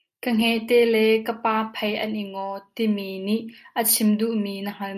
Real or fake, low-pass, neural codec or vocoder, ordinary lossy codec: real; 14.4 kHz; none; MP3, 96 kbps